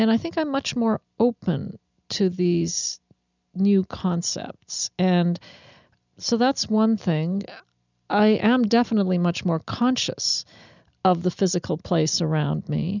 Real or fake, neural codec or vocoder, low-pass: real; none; 7.2 kHz